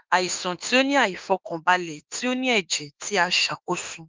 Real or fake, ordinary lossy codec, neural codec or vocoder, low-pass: fake; Opus, 32 kbps; autoencoder, 48 kHz, 32 numbers a frame, DAC-VAE, trained on Japanese speech; 7.2 kHz